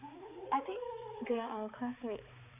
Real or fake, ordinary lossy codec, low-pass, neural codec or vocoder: fake; none; 3.6 kHz; codec, 16 kHz, 4 kbps, X-Codec, HuBERT features, trained on balanced general audio